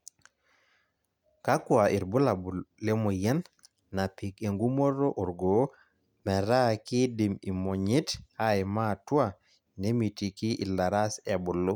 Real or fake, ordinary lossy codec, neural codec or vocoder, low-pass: real; none; none; 19.8 kHz